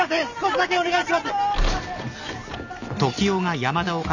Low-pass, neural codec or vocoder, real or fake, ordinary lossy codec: 7.2 kHz; none; real; none